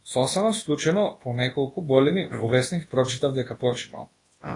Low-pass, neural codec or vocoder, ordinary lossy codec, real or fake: 10.8 kHz; codec, 24 kHz, 0.9 kbps, WavTokenizer, large speech release; AAC, 32 kbps; fake